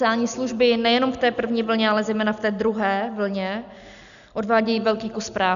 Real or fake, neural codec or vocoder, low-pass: real; none; 7.2 kHz